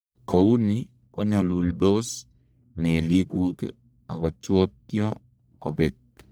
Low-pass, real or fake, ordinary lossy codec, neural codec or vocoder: none; fake; none; codec, 44.1 kHz, 1.7 kbps, Pupu-Codec